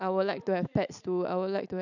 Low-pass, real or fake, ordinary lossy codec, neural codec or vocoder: 7.2 kHz; real; none; none